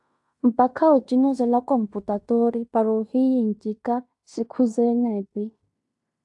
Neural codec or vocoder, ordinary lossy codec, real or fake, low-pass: codec, 16 kHz in and 24 kHz out, 0.9 kbps, LongCat-Audio-Codec, fine tuned four codebook decoder; AAC, 64 kbps; fake; 10.8 kHz